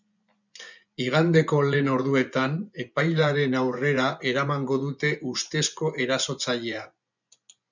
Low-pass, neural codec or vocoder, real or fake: 7.2 kHz; vocoder, 24 kHz, 100 mel bands, Vocos; fake